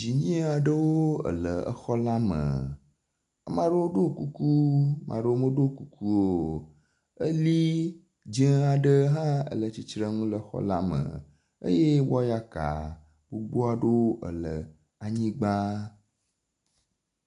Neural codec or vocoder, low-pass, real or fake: none; 10.8 kHz; real